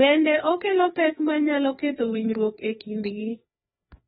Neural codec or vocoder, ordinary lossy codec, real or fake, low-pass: codec, 16 kHz, 2 kbps, FreqCodec, larger model; AAC, 16 kbps; fake; 7.2 kHz